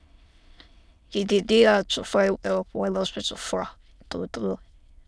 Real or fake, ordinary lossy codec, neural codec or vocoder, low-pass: fake; none; autoencoder, 22.05 kHz, a latent of 192 numbers a frame, VITS, trained on many speakers; none